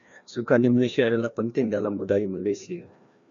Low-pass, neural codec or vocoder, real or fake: 7.2 kHz; codec, 16 kHz, 1 kbps, FreqCodec, larger model; fake